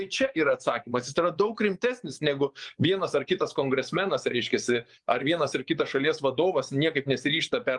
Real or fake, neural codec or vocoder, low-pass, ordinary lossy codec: fake; vocoder, 24 kHz, 100 mel bands, Vocos; 10.8 kHz; Opus, 32 kbps